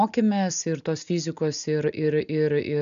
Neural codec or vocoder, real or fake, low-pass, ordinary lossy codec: none; real; 7.2 kHz; AAC, 96 kbps